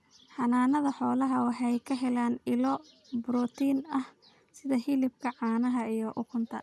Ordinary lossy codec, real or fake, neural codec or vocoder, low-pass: none; real; none; none